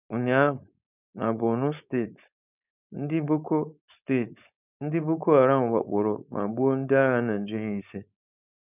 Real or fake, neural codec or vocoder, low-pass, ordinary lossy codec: fake; codec, 16 kHz, 4.8 kbps, FACodec; 3.6 kHz; none